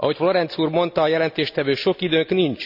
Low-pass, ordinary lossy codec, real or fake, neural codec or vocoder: 5.4 kHz; none; real; none